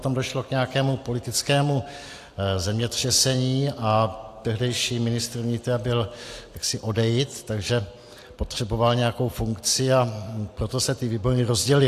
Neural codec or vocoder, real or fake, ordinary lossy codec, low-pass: none; real; AAC, 64 kbps; 14.4 kHz